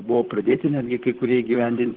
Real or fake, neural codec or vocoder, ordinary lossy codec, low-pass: fake; vocoder, 44.1 kHz, 128 mel bands, Pupu-Vocoder; Opus, 16 kbps; 5.4 kHz